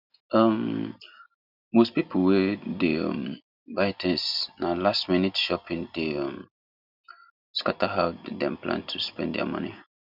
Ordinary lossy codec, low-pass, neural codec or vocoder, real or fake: none; 5.4 kHz; none; real